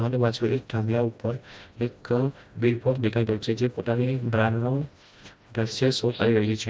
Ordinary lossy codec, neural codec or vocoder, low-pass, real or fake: none; codec, 16 kHz, 1 kbps, FreqCodec, smaller model; none; fake